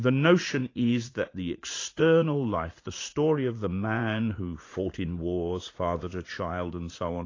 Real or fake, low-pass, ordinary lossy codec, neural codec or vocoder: fake; 7.2 kHz; AAC, 32 kbps; vocoder, 44.1 kHz, 80 mel bands, Vocos